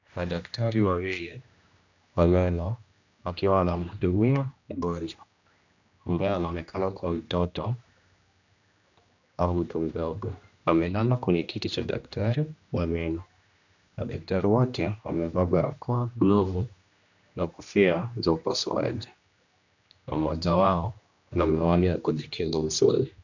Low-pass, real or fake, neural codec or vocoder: 7.2 kHz; fake; codec, 16 kHz, 1 kbps, X-Codec, HuBERT features, trained on general audio